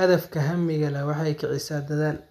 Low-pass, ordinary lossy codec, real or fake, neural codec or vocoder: 14.4 kHz; none; real; none